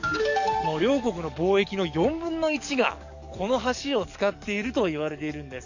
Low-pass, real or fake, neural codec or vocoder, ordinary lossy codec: 7.2 kHz; fake; codec, 44.1 kHz, 7.8 kbps, DAC; none